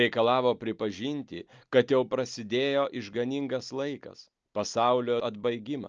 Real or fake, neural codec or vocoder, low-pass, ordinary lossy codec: real; none; 7.2 kHz; Opus, 24 kbps